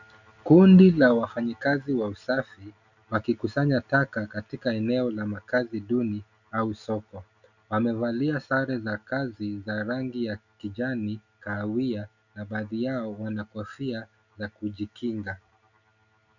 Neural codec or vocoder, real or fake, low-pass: none; real; 7.2 kHz